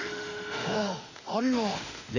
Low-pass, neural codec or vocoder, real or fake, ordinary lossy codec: 7.2 kHz; autoencoder, 48 kHz, 32 numbers a frame, DAC-VAE, trained on Japanese speech; fake; none